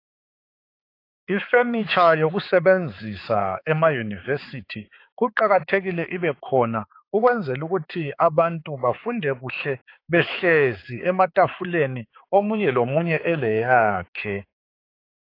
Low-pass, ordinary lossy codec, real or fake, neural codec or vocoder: 5.4 kHz; AAC, 32 kbps; fake; codec, 16 kHz, 4 kbps, X-Codec, HuBERT features, trained on balanced general audio